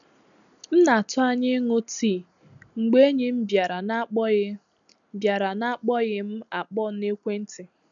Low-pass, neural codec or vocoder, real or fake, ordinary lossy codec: 7.2 kHz; none; real; none